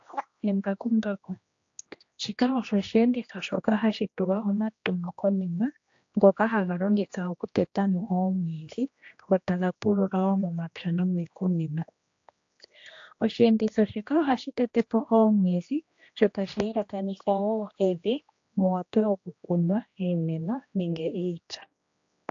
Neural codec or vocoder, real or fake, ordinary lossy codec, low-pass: codec, 16 kHz, 1 kbps, X-Codec, HuBERT features, trained on general audio; fake; AAC, 48 kbps; 7.2 kHz